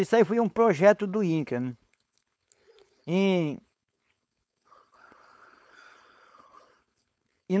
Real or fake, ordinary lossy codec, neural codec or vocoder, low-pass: fake; none; codec, 16 kHz, 4.8 kbps, FACodec; none